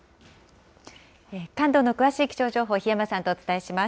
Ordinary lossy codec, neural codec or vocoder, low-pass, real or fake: none; none; none; real